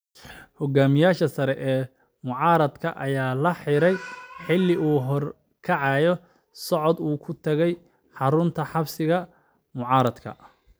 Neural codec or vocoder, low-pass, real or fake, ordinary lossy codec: none; none; real; none